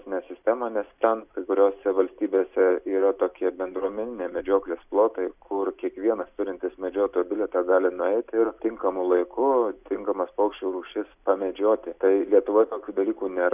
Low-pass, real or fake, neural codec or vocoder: 3.6 kHz; real; none